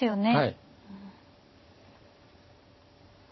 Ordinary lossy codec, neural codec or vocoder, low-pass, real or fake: MP3, 24 kbps; vocoder, 44.1 kHz, 128 mel bands every 512 samples, BigVGAN v2; 7.2 kHz; fake